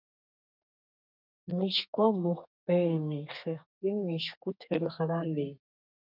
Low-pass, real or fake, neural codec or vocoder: 5.4 kHz; fake; codec, 32 kHz, 1.9 kbps, SNAC